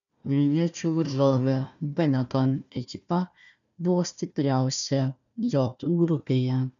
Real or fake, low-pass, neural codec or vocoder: fake; 7.2 kHz; codec, 16 kHz, 1 kbps, FunCodec, trained on Chinese and English, 50 frames a second